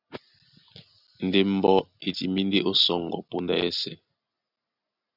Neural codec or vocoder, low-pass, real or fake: none; 5.4 kHz; real